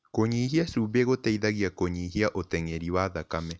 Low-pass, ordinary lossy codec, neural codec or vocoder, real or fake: none; none; none; real